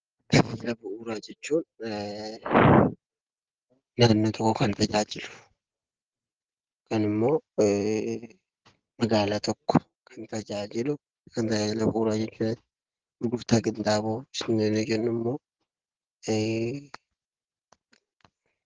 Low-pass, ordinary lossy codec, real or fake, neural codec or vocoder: 7.2 kHz; Opus, 16 kbps; real; none